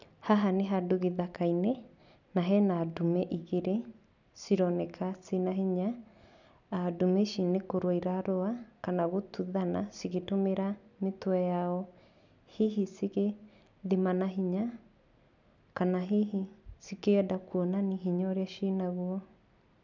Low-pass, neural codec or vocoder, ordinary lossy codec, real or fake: 7.2 kHz; none; none; real